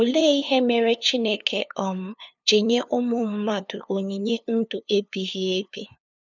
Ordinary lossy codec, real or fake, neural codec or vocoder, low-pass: none; fake; codec, 16 kHz, 2 kbps, FunCodec, trained on LibriTTS, 25 frames a second; 7.2 kHz